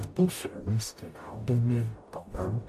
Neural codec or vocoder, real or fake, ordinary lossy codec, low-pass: codec, 44.1 kHz, 0.9 kbps, DAC; fake; MP3, 64 kbps; 14.4 kHz